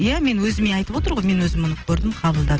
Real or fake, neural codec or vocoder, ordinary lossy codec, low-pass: real; none; Opus, 16 kbps; 7.2 kHz